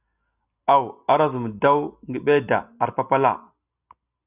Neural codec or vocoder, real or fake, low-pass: none; real; 3.6 kHz